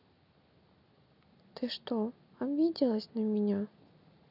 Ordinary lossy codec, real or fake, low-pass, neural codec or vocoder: none; real; 5.4 kHz; none